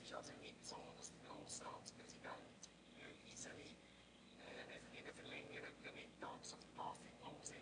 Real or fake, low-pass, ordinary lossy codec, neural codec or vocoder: fake; 9.9 kHz; MP3, 48 kbps; autoencoder, 22.05 kHz, a latent of 192 numbers a frame, VITS, trained on one speaker